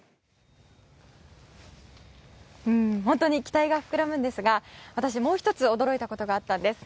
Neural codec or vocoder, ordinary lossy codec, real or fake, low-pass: none; none; real; none